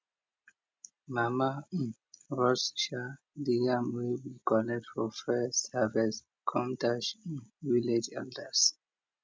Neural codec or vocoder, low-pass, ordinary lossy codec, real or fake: none; none; none; real